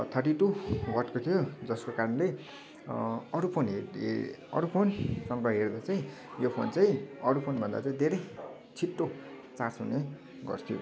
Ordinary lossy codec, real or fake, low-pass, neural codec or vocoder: none; real; none; none